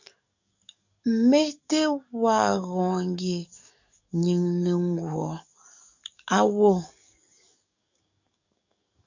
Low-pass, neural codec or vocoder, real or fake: 7.2 kHz; codec, 44.1 kHz, 7.8 kbps, DAC; fake